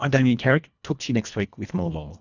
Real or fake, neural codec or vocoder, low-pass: fake; codec, 24 kHz, 1.5 kbps, HILCodec; 7.2 kHz